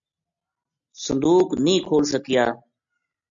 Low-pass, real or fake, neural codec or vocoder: 7.2 kHz; real; none